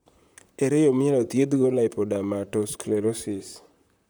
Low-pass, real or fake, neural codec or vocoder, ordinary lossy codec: none; fake; vocoder, 44.1 kHz, 128 mel bands, Pupu-Vocoder; none